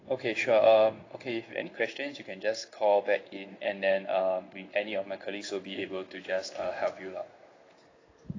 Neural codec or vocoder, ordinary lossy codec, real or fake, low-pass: codec, 16 kHz in and 24 kHz out, 1 kbps, XY-Tokenizer; AAC, 32 kbps; fake; 7.2 kHz